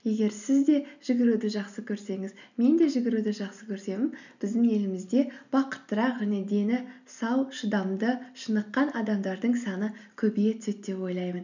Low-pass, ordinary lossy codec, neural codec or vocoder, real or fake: 7.2 kHz; none; none; real